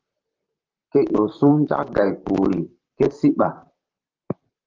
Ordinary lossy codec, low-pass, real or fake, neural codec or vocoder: Opus, 32 kbps; 7.2 kHz; fake; vocoder, 44.1 kHz, 128 mel bands, Pupu-Vocoder